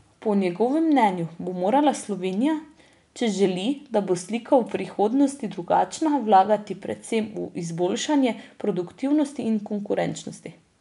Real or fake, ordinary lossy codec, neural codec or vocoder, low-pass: real; none; none; 10.8 kHz